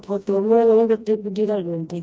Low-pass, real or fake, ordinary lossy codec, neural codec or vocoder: none; fake; none; codec, 16 kHz, 1 kbps, FreqCodec, smaller model